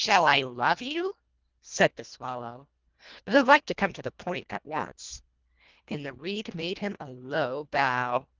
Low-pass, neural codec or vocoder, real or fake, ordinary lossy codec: 7.2 kHz; codec, 24 kHz, 1.5 kbps, HILCodec; fake; Opus, 24 kbps